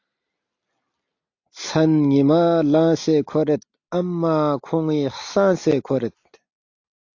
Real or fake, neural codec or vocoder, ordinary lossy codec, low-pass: real; none; AAC, 48 kbps; 7.2 kHz